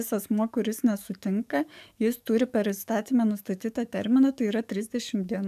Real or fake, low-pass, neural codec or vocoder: fake; 14.4 kHz; codec, 44.1 kHz, 7.8 kbps, DAC